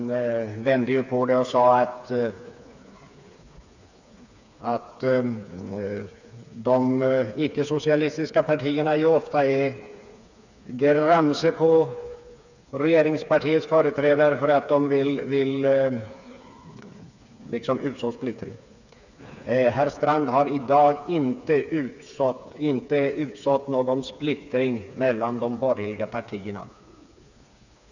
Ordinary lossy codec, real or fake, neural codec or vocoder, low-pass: none; fake; codec, 16 kHz, 4 kbps, FreqCodec, smaller model; 7.2 kHz